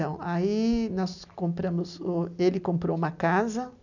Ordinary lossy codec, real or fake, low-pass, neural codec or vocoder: none; real; 7.2 kHz; none